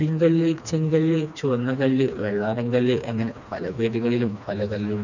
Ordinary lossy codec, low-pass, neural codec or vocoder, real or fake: none; 7.2 kHz; codec, 16 kHz, 2 kbps, FreqCodec, smaller model; fake